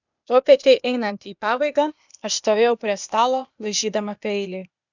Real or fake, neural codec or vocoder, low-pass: fake; codec, 16 kHz, 0.8 kbps, ZipCodec; 7.2 kHz